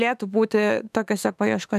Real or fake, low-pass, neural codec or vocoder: fake; 14.4 kHz; autoencoder, 48 kHz, 32 numbers a frame, DAC-VAE, trained on Japanese speech